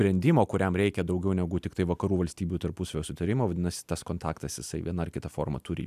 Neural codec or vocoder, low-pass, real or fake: none; 14.4 kHz; real